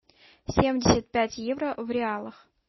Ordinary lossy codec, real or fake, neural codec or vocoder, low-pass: MP3, 24 kbps; real; none; 7.2 kHz